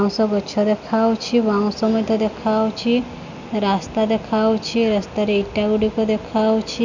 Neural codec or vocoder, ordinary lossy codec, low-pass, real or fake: none; none; 7.2 kHz; real